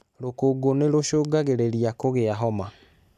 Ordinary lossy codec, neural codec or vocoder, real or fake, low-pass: none; none; real; 14.4 kHz